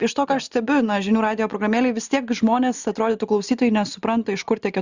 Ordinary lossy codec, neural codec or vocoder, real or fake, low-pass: Opus, 64 kbps; none; real; 7.2 kHz